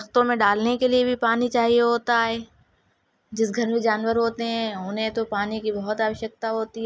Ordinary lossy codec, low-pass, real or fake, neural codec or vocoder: none; none; real; none